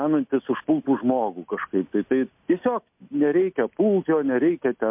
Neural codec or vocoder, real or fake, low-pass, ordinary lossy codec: none; real; 3.6 kHz; MP3, 32 kbps